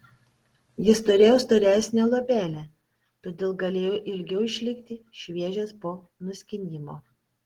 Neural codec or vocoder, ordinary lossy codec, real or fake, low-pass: none; Opus, 16 kbps; real; 19.8 kHz